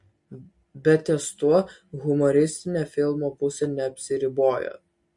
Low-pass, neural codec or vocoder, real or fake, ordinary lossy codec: 10.8 kHz; none; real; MP3, 48 kbps